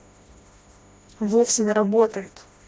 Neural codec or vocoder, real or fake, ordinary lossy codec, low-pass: codec, 16 kHz, 1 kbps, FreqCodec, smaller model; fake; none; none